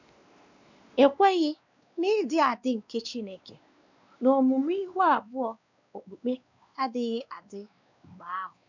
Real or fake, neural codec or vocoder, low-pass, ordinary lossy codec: fake; codec, 16 kHz, 2 kbps, X-Codec, WavLM features, trained on Multilingual LibriSpeech; 7.2 kHz; none